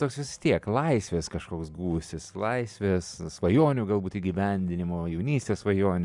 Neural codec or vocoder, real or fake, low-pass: none; real; 10.8 kHz